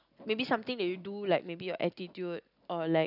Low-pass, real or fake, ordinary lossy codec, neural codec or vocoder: 5.4 kHz; real; none; none